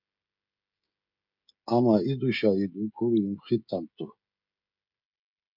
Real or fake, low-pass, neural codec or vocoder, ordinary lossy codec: fake; 5.4 kHz; codec, 16 kHz, 16 kbps, FreqCodec, smaller model; MP3, 48 kbps